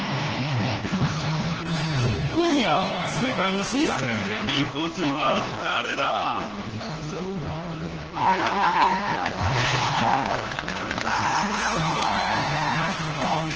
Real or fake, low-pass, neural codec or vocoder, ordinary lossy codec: fake; 7.2 kHz; codec, 16 kHz, 1 kbps, FunCodec, trained on LibriTTS, 50 frames a second; Opus, 16 kbps